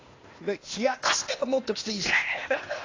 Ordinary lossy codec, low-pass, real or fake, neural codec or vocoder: AAC, 32 kbps; 7.2 kHz; fake; codec, 16 kHz, 0.8 kbps, ZipCodec